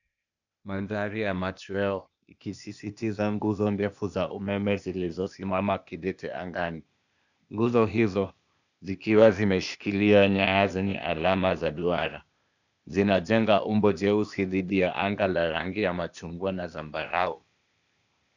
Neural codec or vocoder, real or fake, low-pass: codec, 16 kHz, 0.8 kbps, ZipCodec; fake; 7.2 kHz